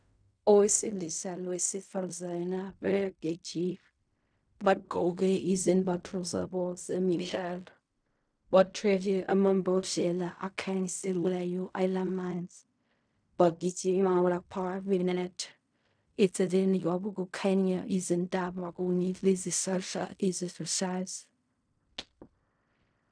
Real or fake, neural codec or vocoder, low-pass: fake; codec, 16 kHz in and 24 kHz out, 0.4 kbps, LongCat-Audio-Codec, fine tuned four codebook decoder; 9.9 kHz